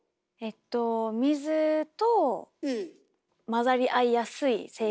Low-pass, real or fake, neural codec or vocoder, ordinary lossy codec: none; real; none; none